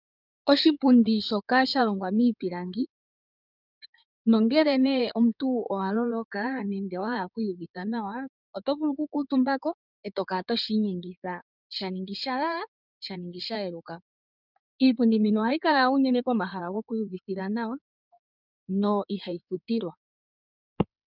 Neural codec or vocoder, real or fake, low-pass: codec, 16 kHz in and 24 kHz out, 2.2 kbps, FireRedTTS-2 codec; fake; 5.4 kHz